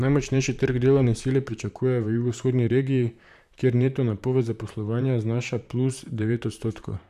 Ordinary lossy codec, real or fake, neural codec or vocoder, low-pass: none; fake; vocoder, 44.1 kHz, 128 mel bands, Pupu-Vocoder; 14.4 kHz